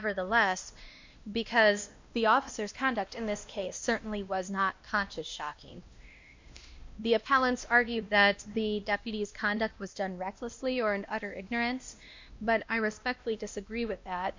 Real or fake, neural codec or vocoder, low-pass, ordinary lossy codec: fake; codec, 16 kHz, 1 kbps, X-Codec, WavLM features, trained on Multilingual LibriSpeech; 7.2 kHz; MP3, 64 kbps